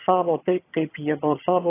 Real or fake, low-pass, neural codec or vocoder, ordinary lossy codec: fake; 3.6 kHz; vocoder, 22.05 kHz, 80 mel bands, HiFi-GAN; AAC, 16 kbps